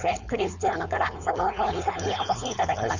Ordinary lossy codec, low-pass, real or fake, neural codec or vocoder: none; 7.2 kHz; fake; codec, 16 kHz, 4.8 kbps, FACodec